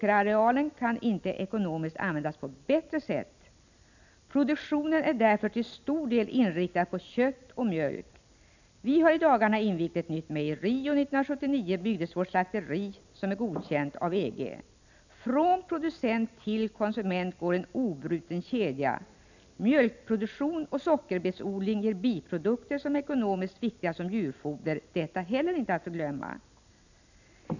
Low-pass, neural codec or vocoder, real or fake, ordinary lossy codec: 7.2 kHz; none; real; none